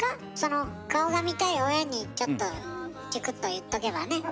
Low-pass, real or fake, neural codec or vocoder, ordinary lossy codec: none; real; none; none